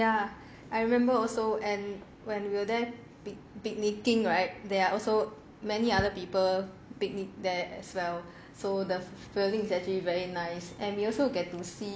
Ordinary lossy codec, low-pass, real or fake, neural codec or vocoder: none; none; real; none